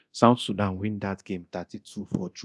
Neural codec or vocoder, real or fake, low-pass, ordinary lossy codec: codec, 24 kHz, 0.9 kbps, DualCodec; fake; none; none